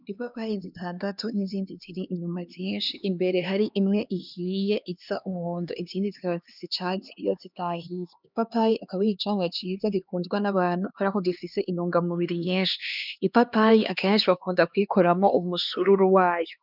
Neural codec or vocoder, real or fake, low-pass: codec, 16 kHz, 2 kbps, X-Codec, HuBERT features, trained on LibriSpeech; fake; 5.4 kHz